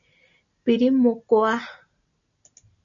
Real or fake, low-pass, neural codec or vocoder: real; 7.2 kHz; none